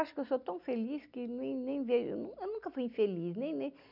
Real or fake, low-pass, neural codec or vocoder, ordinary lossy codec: real; 5.4 kHz; none; none